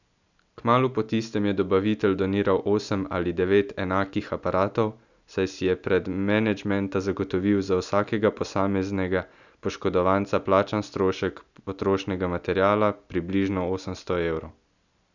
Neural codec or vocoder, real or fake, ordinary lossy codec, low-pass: none; real; none; 7.2 kHz